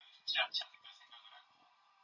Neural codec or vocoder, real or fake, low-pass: none; real; 7.2 kHz